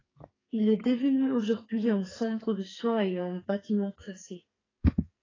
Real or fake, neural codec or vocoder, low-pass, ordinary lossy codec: fake; codec, 44.1 kHz, 2.6 kbps, SNAC; 7.2 kHz; AAC, 32 kbps